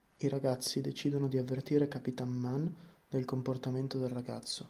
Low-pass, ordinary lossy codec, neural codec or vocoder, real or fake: 14.4 kHz; Opus, 32 kbps; none; real